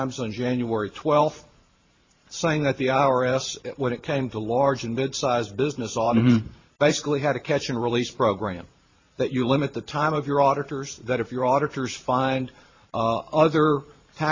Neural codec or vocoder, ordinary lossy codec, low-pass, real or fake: vocoder, 44.1 kHz, 128 mel bands every 512 samples, BigVGAN v2; MP3, 32 kbps; 7.2 kHz; fake